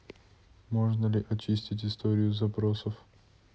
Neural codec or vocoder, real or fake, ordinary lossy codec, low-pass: none; real; none; none